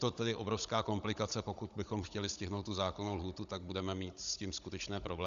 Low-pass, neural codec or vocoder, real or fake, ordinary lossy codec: 7.2 kHz; codec, 16 kHz, 16 kbps, FunCodec, trained on Chinese and English, 50 frames a second; fake; Opus, 64 kbps